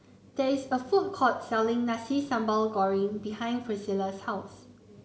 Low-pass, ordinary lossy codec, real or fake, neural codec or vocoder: none; none; real; none